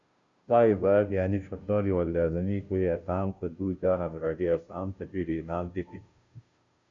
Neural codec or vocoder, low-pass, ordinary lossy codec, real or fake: codec, 16 kHz, 0.5 kbps, FunCodec, trained on Chinese and English, 25 frames a second; 7.2 kHz; Opus, 64 kbps; fake